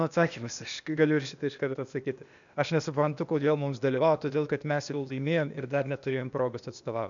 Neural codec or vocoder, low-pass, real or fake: codec, 16 kHz, 0.8 kbps, ZipCodec; 7.2 kHz; fake